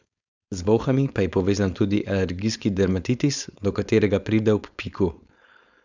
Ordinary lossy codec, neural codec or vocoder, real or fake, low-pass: none; codec, 16 kHz, 4.8 kbps, FACodec; fake; 7.2 kHz